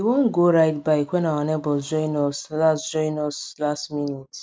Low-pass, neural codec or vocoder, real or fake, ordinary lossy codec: none; none; real; none